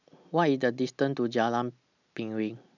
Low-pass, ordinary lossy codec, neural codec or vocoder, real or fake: 7.2 kHz; none; none; real